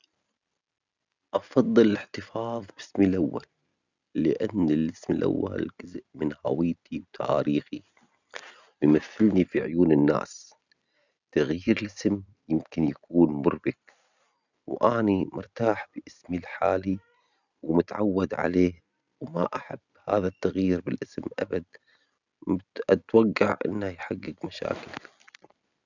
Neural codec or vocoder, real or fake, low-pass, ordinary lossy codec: none; real; 7.2 kHz; none